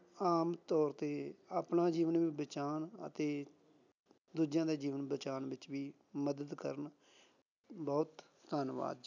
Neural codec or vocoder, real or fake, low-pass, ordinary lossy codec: none; real; 7.2 kHz; none